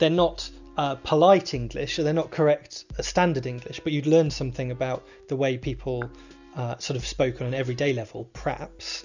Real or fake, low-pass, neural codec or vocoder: real; 7.2 kHz; none